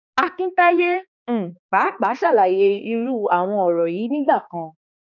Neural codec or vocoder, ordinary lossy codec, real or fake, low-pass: codec, 16 kHz, 2 kbps, X-Codec, HuBERT features, trained on balanced general audio; none; fake; 7.2 kHz